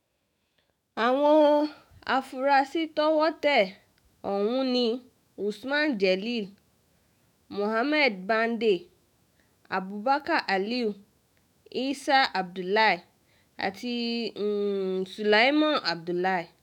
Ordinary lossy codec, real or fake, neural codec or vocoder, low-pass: none; fake; autoencoder, 48 kHz, 128 numbers a frame, DAC-VAE, trained on Japanese speech; 19.8 kHz